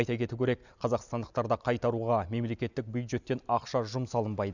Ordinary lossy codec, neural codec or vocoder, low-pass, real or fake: none; none; 7.2 kHz; real